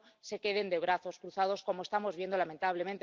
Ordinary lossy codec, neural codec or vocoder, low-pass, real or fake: Opus, 32 kbps; none; 7.2 kHz; real